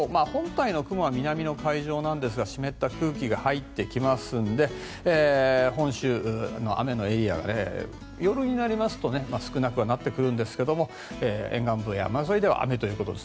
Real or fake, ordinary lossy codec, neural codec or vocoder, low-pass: real; none; none; none